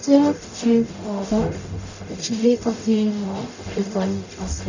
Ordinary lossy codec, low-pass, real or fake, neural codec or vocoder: none; 7.2 kHz; fake; codec, 44.1 kHz, 0.9 kbps, DAC